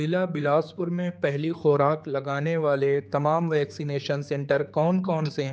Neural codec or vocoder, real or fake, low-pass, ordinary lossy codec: codec, 16 kHz, 4 kbps, X-Codec, HuBERT features, trained on general audio; fake; none; none